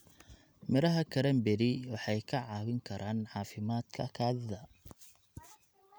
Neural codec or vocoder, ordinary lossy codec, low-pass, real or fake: none; none; none; real